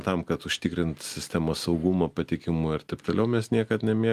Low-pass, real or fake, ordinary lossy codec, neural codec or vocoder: 14.4 kHz; real; Opus, 32 kbps; none